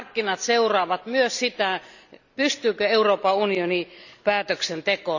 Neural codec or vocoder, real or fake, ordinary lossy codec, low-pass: none; real; none; 7.2 kHz